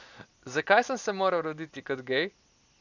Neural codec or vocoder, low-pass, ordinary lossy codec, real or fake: none; 7.2 kHz; none; real